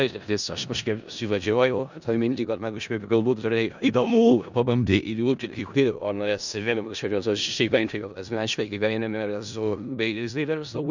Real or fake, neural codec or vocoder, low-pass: fake; codec, 16 kHz in and 24 kHz out, 0.4 kbps, LongCat-Audio-Codec, four codebook decoder; 7.2 kHz